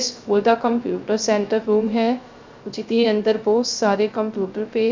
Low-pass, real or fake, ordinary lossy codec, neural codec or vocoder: 7.2 kHz; fake; MP3, 64 kbps; codec, 16 kHz, 0.3 kbps, FocalCodec